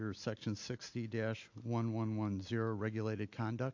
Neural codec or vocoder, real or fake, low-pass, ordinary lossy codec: none; real; 7.2 kHz; AAC, 48 kbps